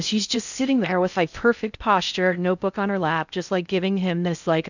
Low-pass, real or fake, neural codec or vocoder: 7.2 kHz; fake; codec, 16 kHz in and 24 kHz out, 0.6 kbps, FocalCodec, streaming, 4096 codes